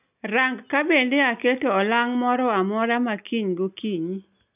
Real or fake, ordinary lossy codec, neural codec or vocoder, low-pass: real; none; none; 3.6 kHz